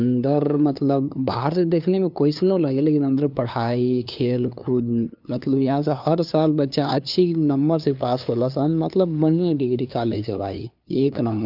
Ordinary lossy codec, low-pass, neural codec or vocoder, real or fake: none; 5.4 kHz; codec, 16 kHz, 2 kbps, FunCodec, trained on LibriTTS, 25 frames a second; fake